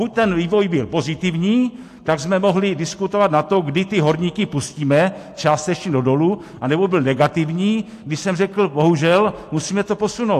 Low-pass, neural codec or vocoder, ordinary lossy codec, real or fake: 14.4 kHz; none; AAC, 64 kbps; real